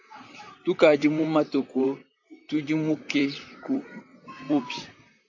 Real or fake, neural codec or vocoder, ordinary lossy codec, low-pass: fake; vocoder, 44.1 kHz, 128 mel bands, Pupu-Vocoder; AAC, 48 kbps; 7.2 kHz